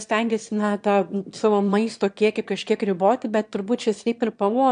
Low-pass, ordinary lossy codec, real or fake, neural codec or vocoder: 9.9 kHz; AAC, 64 kbps; fake; autoencoder, 22.05 kHz, a latent of 192 numbers a frame, VITS, trained on one speaker